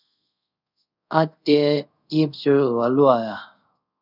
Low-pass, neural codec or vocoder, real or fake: 5.4 kHz; codec, 24 kHz, 0.5 kbps, DualCodec; fake